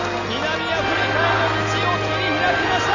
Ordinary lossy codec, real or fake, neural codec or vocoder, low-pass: none; real; none; 7.2 kHz